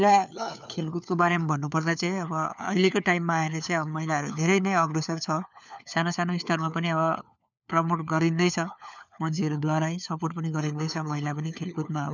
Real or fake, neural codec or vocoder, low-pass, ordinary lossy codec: fake; codec, 16 kHz, 4 kbps, FunCodec, trained on LibriTTS, 50 frames a second; 7.2 kHz; none